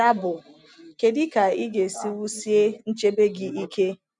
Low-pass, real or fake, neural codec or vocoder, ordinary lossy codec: 10.8 kHz; real; none; none